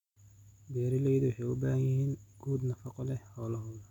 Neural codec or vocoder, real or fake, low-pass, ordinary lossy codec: none; real; 19.8 kHz; none